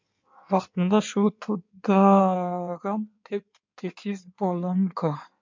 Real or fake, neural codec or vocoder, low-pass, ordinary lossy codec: fake; codec, 16 kHz in and 24 kHz out, 1.1 kbps, FireRedTTS-2 codec; 7.2 kHz; MP3, 64 kbps